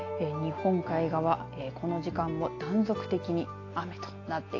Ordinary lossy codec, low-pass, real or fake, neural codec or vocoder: AAC, 32 kbps; 7.2 kHz; real; none